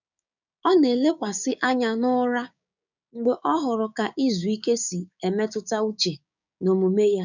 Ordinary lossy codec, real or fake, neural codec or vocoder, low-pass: none; fake; codec, 16 kHz, 6 kbps, DAC; 7.2 kHz